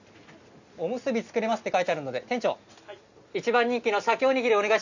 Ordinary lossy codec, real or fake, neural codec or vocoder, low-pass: none; real; none; 7.2 kHz